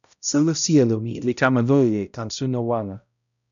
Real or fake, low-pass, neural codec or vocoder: fake; 7.2 kHz; codec, 16 kHz, 0.5 kbps, X-Codec, HuBERT features, trained on balanced general audio